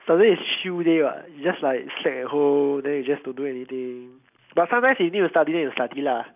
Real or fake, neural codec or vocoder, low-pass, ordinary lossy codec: real; none; 3.6 kHz; none